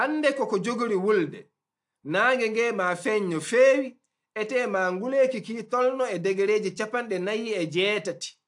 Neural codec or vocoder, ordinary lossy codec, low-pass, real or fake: none; AAC, 64 kbps; 10.8 kHz; real